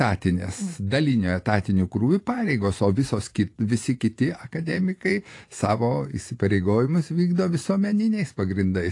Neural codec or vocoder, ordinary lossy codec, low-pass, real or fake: vocoder, 24 kHz, 100 mel bands, Vocos; AAC, 48 kbps; 10.8 kHz; fake